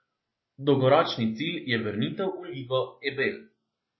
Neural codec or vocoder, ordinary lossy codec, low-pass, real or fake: none; MP3, 24 kbps; 7.2 kHz; real